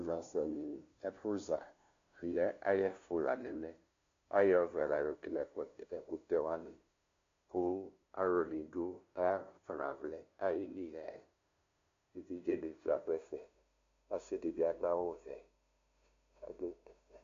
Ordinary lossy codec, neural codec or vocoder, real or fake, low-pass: AAC, 48 kbps; codec, 16 kHz, 0.5 kbps, FunCodec, trained on LibriTTS, 25 frames a second; fake; 7.2 kHz